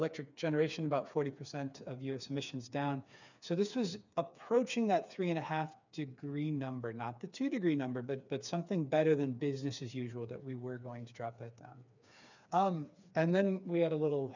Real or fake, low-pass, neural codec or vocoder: fake; 7.2 kHz; codec, 16 kHz, 4 kbps, FreqCodec, smaller model